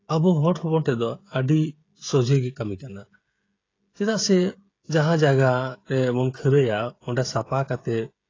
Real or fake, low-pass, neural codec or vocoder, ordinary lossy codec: fake; 7.2 kHz; codec, 16 kHz, 16 kbps, FreqCodec, smaller model; AAC, 32 kbps